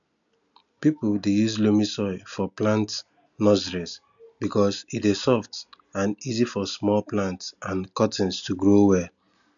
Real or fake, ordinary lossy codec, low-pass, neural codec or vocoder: real; none; 7.2 kHz; none